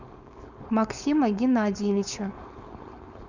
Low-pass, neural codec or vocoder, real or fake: 7.2 kHz; codec, 16 kHz, 4.8 kbps, FACodec; fake